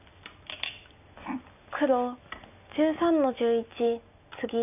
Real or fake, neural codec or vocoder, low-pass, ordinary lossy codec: real; none; 3.6 kHz; none